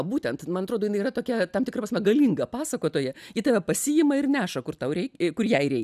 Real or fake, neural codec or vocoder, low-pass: real; none; 14.4 kHz